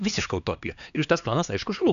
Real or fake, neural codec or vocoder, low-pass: fake; codec, 16 kHz, 2 kbps, X-Codec, HuBERT features, trained on LibriSpeech; 7.2 kHz